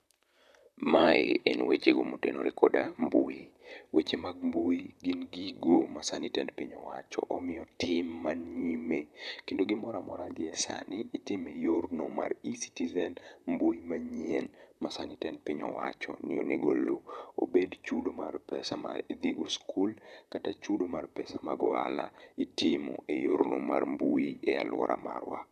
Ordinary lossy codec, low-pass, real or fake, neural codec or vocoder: none; 14.4 kHz; fake; vocoder, 44.1 kHz, 128 mel bands, Pupu-Vocoder